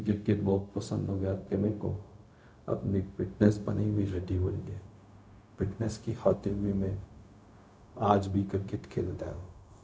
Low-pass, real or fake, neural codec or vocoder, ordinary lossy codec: none; fake; codec, 16 kHz, 0.4 kbps, LongCat-Audio-Codec; none